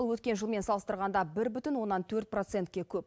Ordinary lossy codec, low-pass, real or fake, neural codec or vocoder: none; none; real; none